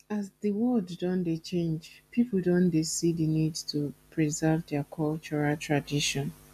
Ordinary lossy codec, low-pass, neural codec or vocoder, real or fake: AAC, 96 kbps; 14.4 kHz; none; real